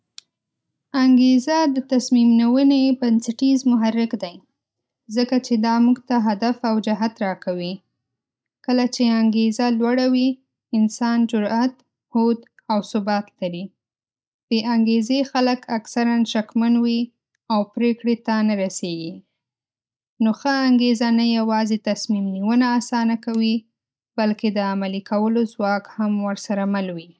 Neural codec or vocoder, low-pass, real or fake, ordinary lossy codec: none; none; real; none